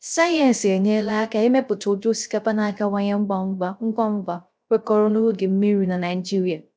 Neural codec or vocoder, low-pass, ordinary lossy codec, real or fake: codec, 16 kHz, 0.3 kbps, FocalCodec; none; none; fake